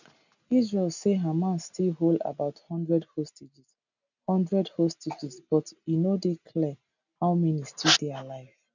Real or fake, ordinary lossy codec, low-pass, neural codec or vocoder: real; none; 7.2 kHz; none